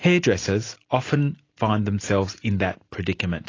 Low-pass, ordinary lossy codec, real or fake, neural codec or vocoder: 7.2 kHz; AAC, 32 kbps; real; none